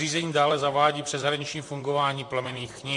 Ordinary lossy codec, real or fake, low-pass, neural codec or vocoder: MP3, 48 kbps; fake; 10.8 kHz; vocoder, 44.1 kHz, 128 mel bands, Pupu-Vocoder